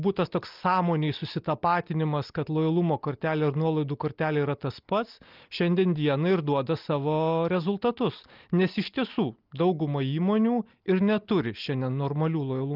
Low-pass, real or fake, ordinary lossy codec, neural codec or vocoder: 5.4 kHz; real; Opus, 24 kbps; none